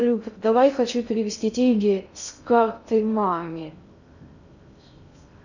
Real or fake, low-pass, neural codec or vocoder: fake; 7.2 kHz; codec, 16 kHz in and 24 kHz out, 0.6 kbps, FocalCodec, streaming, 2048 codes